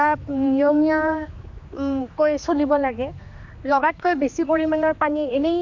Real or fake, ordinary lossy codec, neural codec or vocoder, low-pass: fake; MP3, 48 kbps; codec, 16 kHz, 2 kbps, X-Codec, HuBERT features, trained on general audio; 7.2 kHz